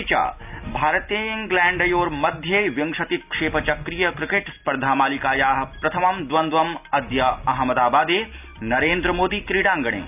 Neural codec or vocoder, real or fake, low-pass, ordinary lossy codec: none; real; 3.6 kHz; none